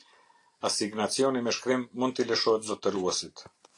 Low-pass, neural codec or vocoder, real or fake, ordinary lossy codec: 10.8 kHz; none; real; AAC, 48 kbps